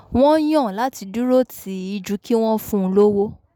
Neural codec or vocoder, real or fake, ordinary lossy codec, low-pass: none; real; none; none